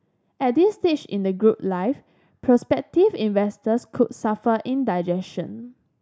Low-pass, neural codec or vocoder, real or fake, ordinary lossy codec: none; none; real; none